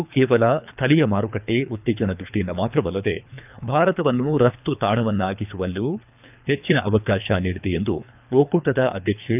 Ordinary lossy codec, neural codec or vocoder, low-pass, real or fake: none; codec, 24 kHz, 3 kbps, HILCodec; 3.6 kHz; fake